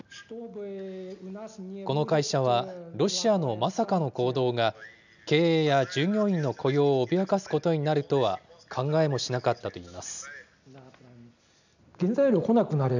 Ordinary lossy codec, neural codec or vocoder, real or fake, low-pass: none; none; real; 7.2 kHz